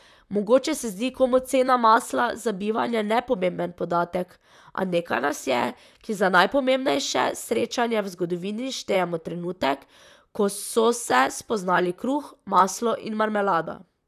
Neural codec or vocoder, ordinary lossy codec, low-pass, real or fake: vocoder, 44.1 kHz, 128 mel bands, Pupu-Vocoder; none; 14.4 kHz; fake